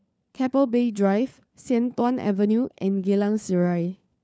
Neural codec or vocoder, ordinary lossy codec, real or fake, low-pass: codec, 16 kHz, 4 kbps, FunCodec, trained on LibriTTS, 50 frames a second; none; fake; none